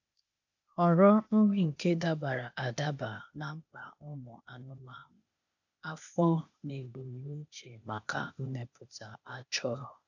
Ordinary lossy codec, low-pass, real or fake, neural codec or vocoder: none; 7.2 kHz; fake; codec, 16 kHz, 0.8 kbps, ZipCodec